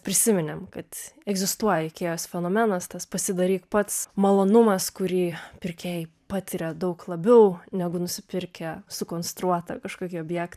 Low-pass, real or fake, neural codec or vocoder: 14.4 kHz; fake; vocoder, 44.1 kHz, 128 mel bands every 512 samples, BigVGAN v2